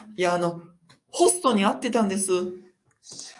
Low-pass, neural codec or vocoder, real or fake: 10.8 kHz; codec, 44.1 kHz, 7.8 kbps, DAC; fake